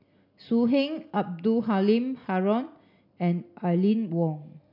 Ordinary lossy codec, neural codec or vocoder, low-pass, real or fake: none; none; 5.4 kHz; real